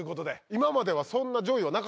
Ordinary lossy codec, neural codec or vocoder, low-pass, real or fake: none; none; none; real